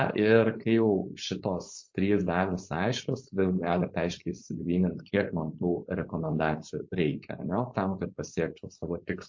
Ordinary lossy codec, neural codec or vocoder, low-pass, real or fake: MP3, 48 kbps; codec, 16 kHz, 4.8 kbps, FACodec; 7.2 kHz; fake